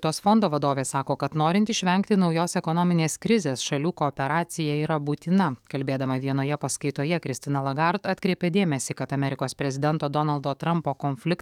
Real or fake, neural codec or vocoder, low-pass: fake; codec, 44.1 kHz, 7.8 kbps, DAC; 19.8 kHz